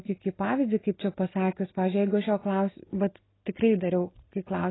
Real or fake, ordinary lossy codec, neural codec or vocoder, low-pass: real; AAC, 16 kbps; none; 7.2 kHz